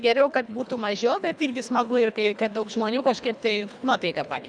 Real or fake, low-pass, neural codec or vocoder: fake; 9.9 kHz; codec, 24 kHz, 1.5 kbps, HILCodec